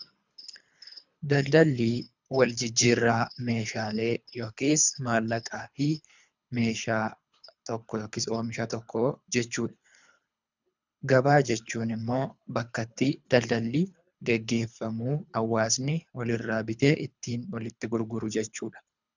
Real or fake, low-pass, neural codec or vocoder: fake; 7.2 kHz; codec, 24 kHz, 3 kbps, HILCodec